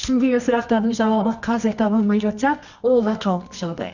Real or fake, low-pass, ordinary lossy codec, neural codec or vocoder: fake; 7.2 kHz; none; codec, 24 kHz, 0.9 kbps, WavTokenizer, medium music audio release